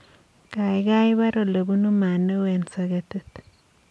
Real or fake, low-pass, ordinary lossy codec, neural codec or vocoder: real; none; none; none